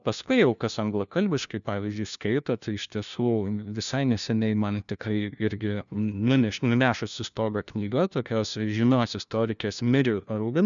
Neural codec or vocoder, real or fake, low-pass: codec, 16 kHz, 1 kbps, FunCodec, trained on LibriTTS, 50 frames a second; fake; 7.2 kHz